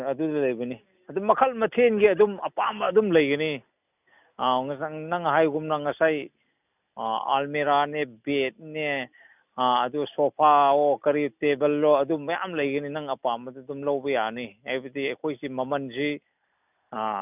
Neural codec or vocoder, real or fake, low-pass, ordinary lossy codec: none; real; 3.6 kHz; none